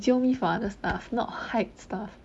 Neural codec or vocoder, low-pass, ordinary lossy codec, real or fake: none; none; none; real